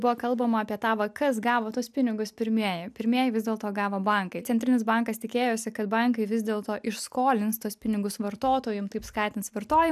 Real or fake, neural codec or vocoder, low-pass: real; none; 14.4 kHz